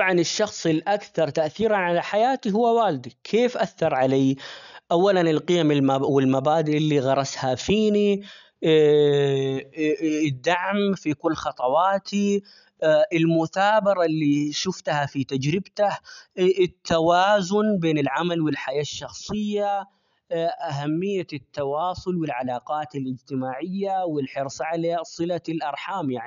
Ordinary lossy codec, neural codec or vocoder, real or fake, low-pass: none; none; real; 7.2 kHz